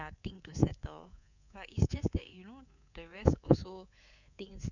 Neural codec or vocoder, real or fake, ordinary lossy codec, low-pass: codec, 24 kHz, 3.1 kbps, DualCodec; fake; none; 7.2 kHz